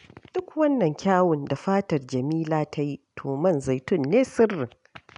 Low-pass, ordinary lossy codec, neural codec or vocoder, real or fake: 10.8 kHz; none; none; real